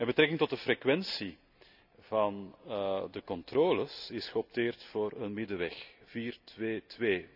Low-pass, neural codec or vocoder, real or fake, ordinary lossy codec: 5.4 kHz; none; real; none